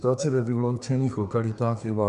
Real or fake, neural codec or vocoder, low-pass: fake; codec, 24 kHz, 1 kbps, SNAC; 10.8 kHz